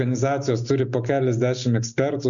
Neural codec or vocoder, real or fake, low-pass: none; real; 7.2 kHz